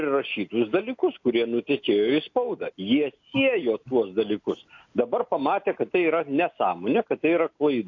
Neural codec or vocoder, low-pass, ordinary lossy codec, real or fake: none; 7.2 kHz; AAC, 48 kbps; real